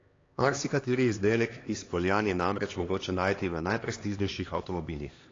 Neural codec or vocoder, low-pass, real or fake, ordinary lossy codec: codec, 16 kHz, 2 kbps, X-Codec, HuBERT features, trained on LibriSpeech; 7.2 kHz; fake; AAC, 32 kbps